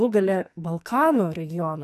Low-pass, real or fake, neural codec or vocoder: 14.4 kHz; fake; codec, 44.1 kHz, 2.6 kbps, SNAC